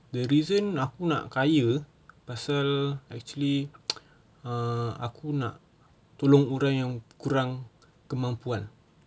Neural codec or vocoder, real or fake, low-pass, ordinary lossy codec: none; real; none; none